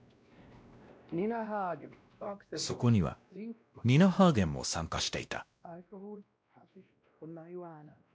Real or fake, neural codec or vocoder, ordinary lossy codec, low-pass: fake; codec, 16 kHz, 1 kbps, X-Codec, WavLM features, trained on Multilingual LibriSpeech; none; none